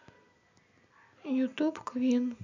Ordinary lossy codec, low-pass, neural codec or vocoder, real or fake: none; 7.2 kHz; none; real